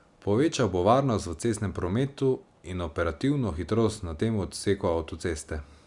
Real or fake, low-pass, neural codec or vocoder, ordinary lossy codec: real; 10.8 kHz; none; Opus, 64 kbps